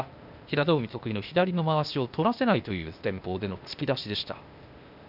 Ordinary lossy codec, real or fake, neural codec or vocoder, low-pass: none; fake; codec, 16 kHz, 0.8 kbps, ZipCodec; 5.4 kHz